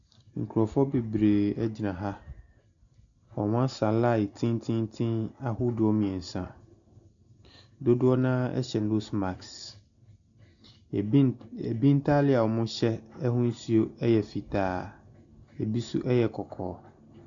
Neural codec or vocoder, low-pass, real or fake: none; 7.2 kHz; real